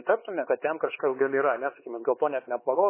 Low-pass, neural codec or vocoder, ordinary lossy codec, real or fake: 3.6 kHz; codec, 16 kHz, 4 kbps, X-Codec, HuBERT features, trained on LibriSpeech; MP3, 16 kbps; fake